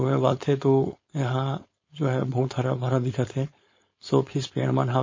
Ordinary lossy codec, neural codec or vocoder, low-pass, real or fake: MP3, 32 kbps; codec, 16 kHz, 4.8 kbps, FACodec; 7.2 kHz; fake